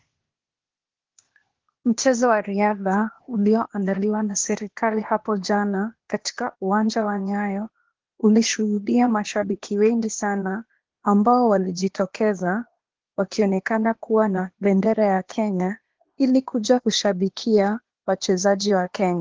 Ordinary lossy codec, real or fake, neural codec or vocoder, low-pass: Opus, 16 kbps; fake; codec, 16 kHz, 0.8 kbps, ZipCodec; 7.2 kHz